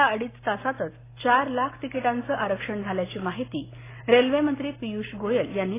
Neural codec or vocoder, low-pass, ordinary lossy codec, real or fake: none; 3.6 kHz; AAC, 16 kbps; real